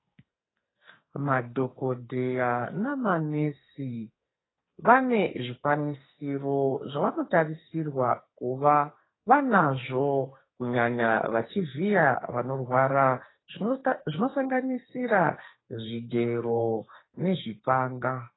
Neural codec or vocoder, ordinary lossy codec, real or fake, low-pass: codec, 44.1 kHz, 2.6 kbps, SNAC; AAC, 16 kbps; fake; 7.2 kHz